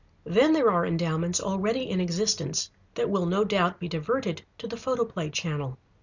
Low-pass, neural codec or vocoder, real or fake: 7.2 kHz; none; real